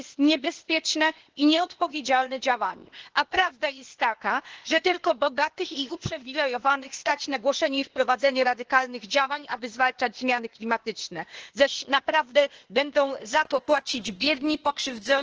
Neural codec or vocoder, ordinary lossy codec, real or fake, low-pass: codec, 16 kHz, 0.8 kbps, ZipCodec; Opus, 16 kbps; fake; 7.2 kHz